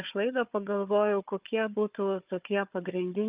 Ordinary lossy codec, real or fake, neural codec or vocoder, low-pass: Opus, 32 kbps; fake; codec, 16 kHz, 4 kbps, FunCodec, trained on Chinese and English, 50 frames a second; 3.6 kHz